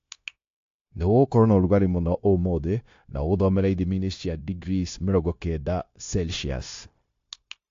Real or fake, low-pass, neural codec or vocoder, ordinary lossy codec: fake; 7.2 kHz; codec, 16 kHz, 0.9 kbps, LongCat-Audio-Codec; AAC, 48 kbps